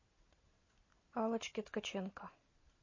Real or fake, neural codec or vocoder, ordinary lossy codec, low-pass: real; none; MP3, 32 kbps; 7.2 kHz